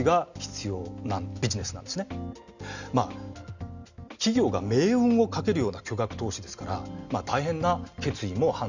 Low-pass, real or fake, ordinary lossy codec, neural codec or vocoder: 7.2 kHz; real; none; none